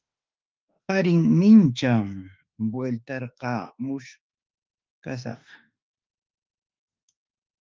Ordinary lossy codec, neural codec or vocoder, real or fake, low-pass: Opus, 24 kbps; autoencoder, 48 kHz, 32 numbers a frame, DAC-VAE, trained on Japanese speech; fake; 7.2 kHz